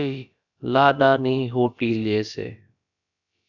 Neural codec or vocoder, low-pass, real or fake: codec, 16 kHz, about 1 kbps, DyCAST, with the encoder's durations; 7.2 kHz; fake